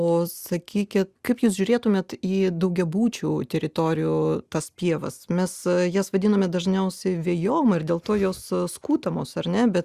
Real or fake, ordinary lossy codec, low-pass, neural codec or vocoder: real; Opus, 64 kbps; 14.4 kHz; none